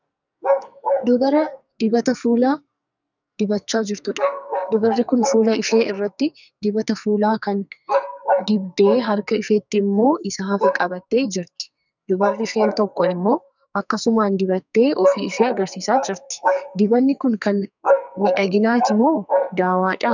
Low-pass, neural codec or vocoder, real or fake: 7.2 kHz; codec, 44.1 kHz, 2.6 kbps, SNAC; fake